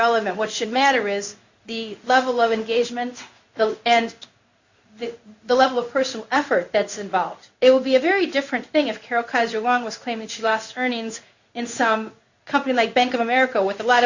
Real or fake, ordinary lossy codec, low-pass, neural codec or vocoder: fake; Opus, 64 kbps; 7.2 kHz; codec, 16 kHz in and 24 kHz out, 1 kbps, XY-Tokenizer